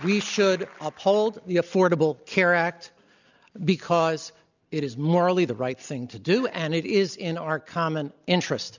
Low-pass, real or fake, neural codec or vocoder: 7.2 kHz; real; none